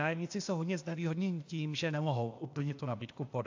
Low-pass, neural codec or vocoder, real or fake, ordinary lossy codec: 7.2 kHz; codec, 16 kHz, 0.8 kbps, ZipCodec; fake; AAC, 48 kbps